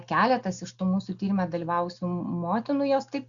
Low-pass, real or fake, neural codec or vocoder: 7.2 kHz; real; none